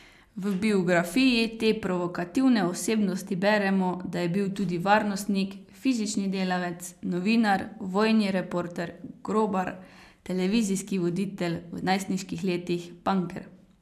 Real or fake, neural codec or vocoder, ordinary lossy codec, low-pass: real; none; none; 14.4 kHz